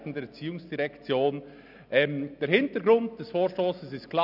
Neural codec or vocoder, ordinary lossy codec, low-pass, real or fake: none; none; 5.4 kHz; real